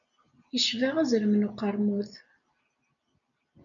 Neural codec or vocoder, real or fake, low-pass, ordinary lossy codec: none; real; 7.2 kHz; MP3, 96 kbps